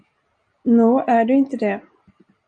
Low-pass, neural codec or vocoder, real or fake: 9.9 kHz; none; real